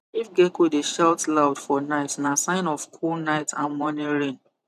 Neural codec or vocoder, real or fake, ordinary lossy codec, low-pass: vocoder, 44.1 kHz, 128 mel bands every 512 samples, BigVGAN v2; fake; none; 14.4 kHz